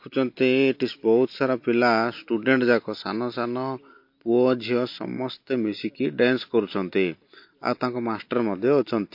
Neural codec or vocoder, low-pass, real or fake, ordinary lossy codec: none; 5.4 kHz; real; MP3, 32 kbps